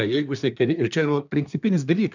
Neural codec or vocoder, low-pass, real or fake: codec, 24 kHz, 1 kbps, SNAC; 7.2 kHz; fake